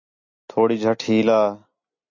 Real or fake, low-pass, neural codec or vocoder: real; 7.2 kHz; none